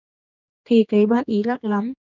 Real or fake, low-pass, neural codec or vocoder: fake; 7.2 kHz; codec, 16 kHz, 2 kbps, X-Codec, HuBERT features, trained on general audio